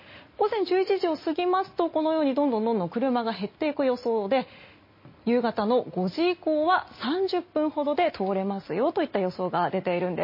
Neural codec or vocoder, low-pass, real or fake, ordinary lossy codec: none; 5.4 kHz; real; MP3, 24 kbps